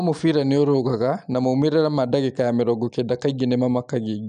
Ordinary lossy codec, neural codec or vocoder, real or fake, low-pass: none; none; real; 9.9 kHz